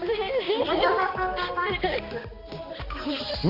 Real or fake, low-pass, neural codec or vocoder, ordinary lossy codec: fake; 5.4 kHz; codec, 16 kHz, 2 kbps, X-Codec, HuBERT features, trained on balanced general audio; none